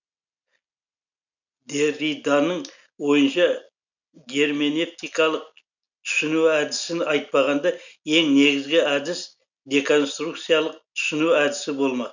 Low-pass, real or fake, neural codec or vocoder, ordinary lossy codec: 7.2 kHz; real; none; none